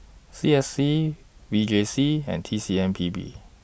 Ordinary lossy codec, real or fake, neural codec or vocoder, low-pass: none; real; none; none